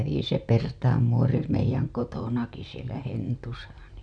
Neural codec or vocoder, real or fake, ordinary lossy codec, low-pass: none; real; none; 9.9 kHz